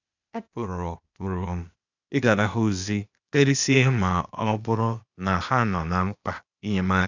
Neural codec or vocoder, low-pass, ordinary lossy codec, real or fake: codec, 16 kHz, 0.8 kbps, ZipCodec; 7.2 kHz; none; fake